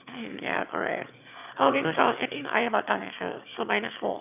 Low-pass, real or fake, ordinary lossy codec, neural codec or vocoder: 3.6 kHz; fake; none; autoencoder, 22.05 kHz, a latent of 192 numbers a frame, VITS, trained on one speaker